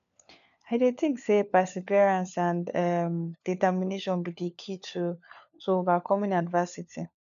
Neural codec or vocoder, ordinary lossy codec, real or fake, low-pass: codec, 16 kHz, 4 kbps, FunCodec, trained on LibriTTS, 50 frames a second; none; fake; 7.2 kHz